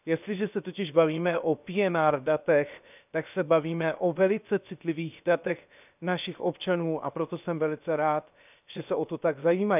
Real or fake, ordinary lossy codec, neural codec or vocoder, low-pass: fake; none; codec, 16 kHz, 0.7 kbps, FocalCodec; 3.6 kHz